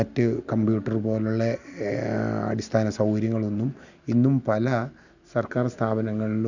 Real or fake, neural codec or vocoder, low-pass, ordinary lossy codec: real; none; 7.2 kHz; none